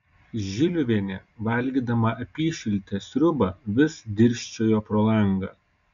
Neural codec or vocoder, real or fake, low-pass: none; real; 7.2 kHz